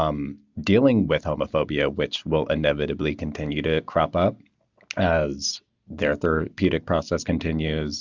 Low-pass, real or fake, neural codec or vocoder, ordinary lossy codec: 7.2 kHz; real; none; Opus, 64 kbps